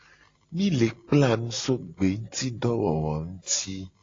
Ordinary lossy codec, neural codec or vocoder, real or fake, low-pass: AAC, 32 kbps; none; real; 7.2 kHz